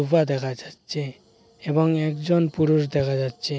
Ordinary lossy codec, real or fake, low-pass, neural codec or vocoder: none; real; none; none